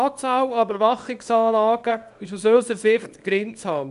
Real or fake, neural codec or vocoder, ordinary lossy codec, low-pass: fake; codec, 24 kHz, 0.9 kbps, WavTokenizer, small release; none; 10.8 kHz